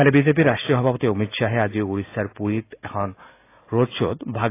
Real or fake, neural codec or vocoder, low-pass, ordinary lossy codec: real; none; 3.6 kHz; AAC, 24 kbps